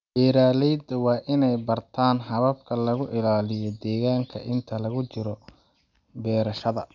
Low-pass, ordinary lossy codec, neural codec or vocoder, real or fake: 7.2 kHz; none; none; real